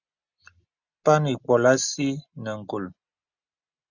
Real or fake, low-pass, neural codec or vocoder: real; 7.2 kHz; none